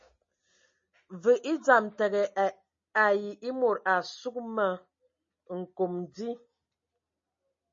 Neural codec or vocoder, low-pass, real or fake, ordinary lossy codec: none; 7.2 kHz; real; MP3, 32 kbps